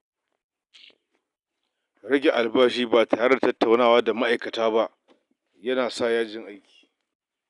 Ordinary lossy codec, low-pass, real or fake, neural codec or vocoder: none; 10.8 kHz; real; none